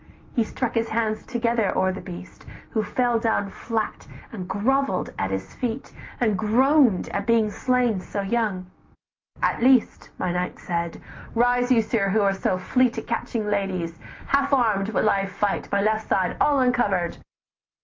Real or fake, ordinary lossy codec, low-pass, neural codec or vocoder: real; Opus, 32 kbps; 7.2 kHz; none